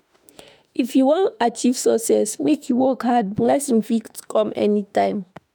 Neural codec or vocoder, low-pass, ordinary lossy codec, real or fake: autoencoder, 48 kHz, 32 numbers a frame, DAC-VAE, trained on Japanese speech; none; none; fake